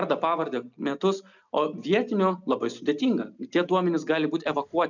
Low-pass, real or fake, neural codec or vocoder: 7.2 kHz; real; none